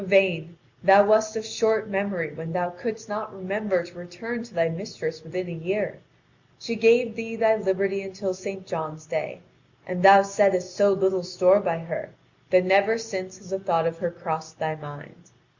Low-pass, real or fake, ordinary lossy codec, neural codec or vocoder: 7.2 kHz; real; Opus, 64 kbps; none